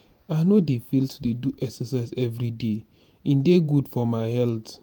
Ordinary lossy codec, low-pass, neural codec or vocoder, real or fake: none; none; vocoder, 48 kHz, 128 mel bands, Vocos; fake